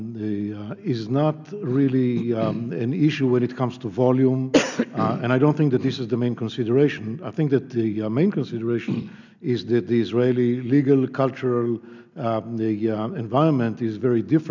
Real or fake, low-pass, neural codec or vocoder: real; 7.2 kHz; none